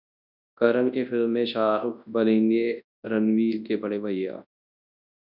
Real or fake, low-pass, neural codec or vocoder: fake; 5.4 kHz; codec, 24 kHz, 0.9 kbps, WavTokenizer, large speech release